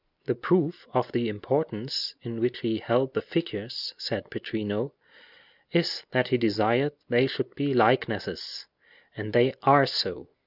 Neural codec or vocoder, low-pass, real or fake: none; 5.4 kHz; real